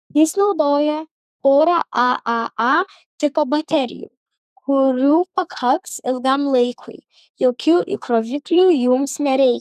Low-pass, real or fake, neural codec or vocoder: 14.4 kHz; fake; codec, 32 kHz, 1.9 kbps, SNAC